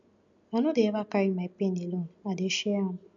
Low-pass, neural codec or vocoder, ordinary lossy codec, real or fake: 7.2 kHz; none; none; real